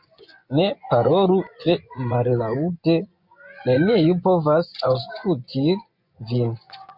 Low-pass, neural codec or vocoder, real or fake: 5.4 kHz; vocoder, 24 kHz, 100 mel bands, Vocos; fake